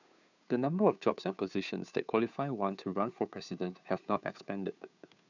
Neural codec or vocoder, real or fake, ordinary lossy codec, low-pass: codec, 16 kHz, 2 kbps, FunCodec, trained on Chinese and English, 25 frames a second; fake; none; 7.2 kHz